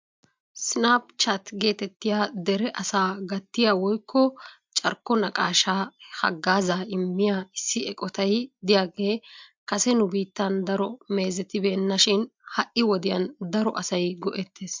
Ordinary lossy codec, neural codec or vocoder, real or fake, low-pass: MP3, 64 kbps; none; real; 7.2 kHz